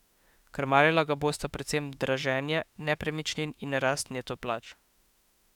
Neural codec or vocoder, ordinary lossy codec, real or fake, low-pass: autoencoder, 48 kHz, 32 numbers a frame, DAC-VAE, trained on Japanese speech; none; fake; 19.8 kHz